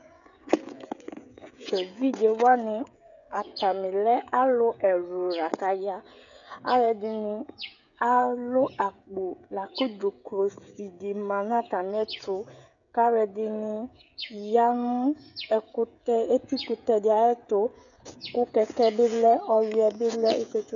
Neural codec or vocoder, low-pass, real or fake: codec, 16 kHz, 16 kbps, FreqCodec, smaller model; 7.2 kHz; fake